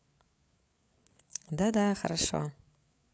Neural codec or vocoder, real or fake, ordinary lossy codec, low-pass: codec, 16 kHz, 8 kbps, FreqCodec, larger model; fake; none; none